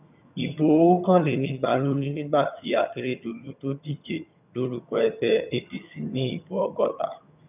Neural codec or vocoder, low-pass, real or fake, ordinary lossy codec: vocoder, 22.05 kHz, 80 mel bands, HiFi-GAN; 3.6 kHz; fake; none